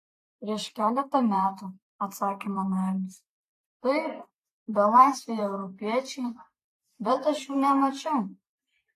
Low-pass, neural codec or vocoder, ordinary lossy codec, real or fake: 14.4 kHz; vocoder, 44.1 kHz, 128 mel bands every 512 samples, BigVGAN v2; AAC, 48 kbps; fake